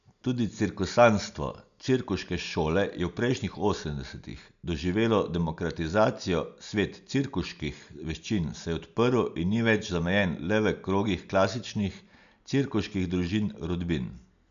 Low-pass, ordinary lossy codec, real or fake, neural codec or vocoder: 7.2 kHz; none; real; none